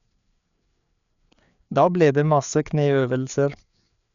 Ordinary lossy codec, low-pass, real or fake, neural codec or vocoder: none; 7.2 kHz; fake; codec, 16 kHz, 4 kbps, FreqCodec, larger model